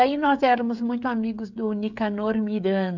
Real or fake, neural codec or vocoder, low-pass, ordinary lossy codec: fake; codec, 16 kHz, 16 kbps, FreqCodec, smaller model; 7.2 kHz; MP3, 64 kbps